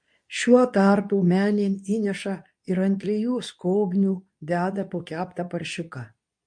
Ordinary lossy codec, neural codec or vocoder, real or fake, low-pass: MP3, 48 kbps; codec, 24 kHz, 0.9 kbps, WavTokenizer, medium speech release version 2; fake; 9.9 kHz